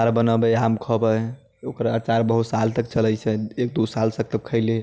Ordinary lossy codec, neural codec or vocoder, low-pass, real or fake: none; none; none; real